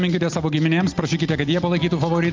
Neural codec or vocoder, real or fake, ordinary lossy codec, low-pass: none; real; Opus, 24 kbps; 7.2 kHz